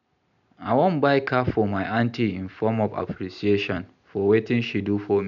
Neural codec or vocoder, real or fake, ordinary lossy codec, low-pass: none; real; none; 7.2 kHz